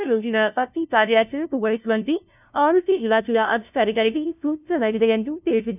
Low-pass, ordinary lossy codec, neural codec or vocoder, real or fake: 3.6 kHz; none; codec, 16 kHz, 0.5 kbps, FunCodec, trained on LibriTTS, 25 frames a second; fake